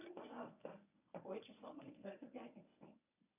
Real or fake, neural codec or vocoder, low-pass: fake; codec, 24 kHz, 0.9 kbps, WavTokenizer, medium speech release version 1; 3.6 kHz